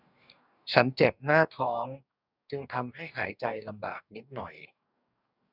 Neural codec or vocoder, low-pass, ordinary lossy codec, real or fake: codec, 44.1 kHz, 2.6 kbps, DAC; 5.4 kHz; none; fake